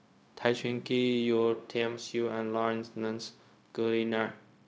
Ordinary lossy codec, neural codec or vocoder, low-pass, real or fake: none; codec, 16 kHz, 0.4 kbps, LongCat-Audio-Codec; none; fake